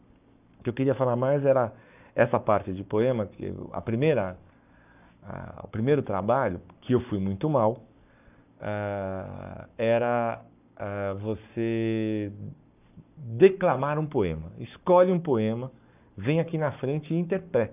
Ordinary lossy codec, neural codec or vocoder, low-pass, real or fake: none; codec, 44.1 kHz, 7.8 kbps, Pupu-Codec; 3.6 kHz; fake